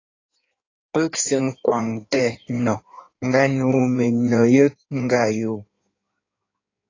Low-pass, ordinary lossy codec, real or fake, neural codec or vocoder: 7.2 kHz; AAC, 32 kbps; fake; codec, 16 kHz in and 24 kHz out, 1.1 kbps, FireRedTTS-2 codec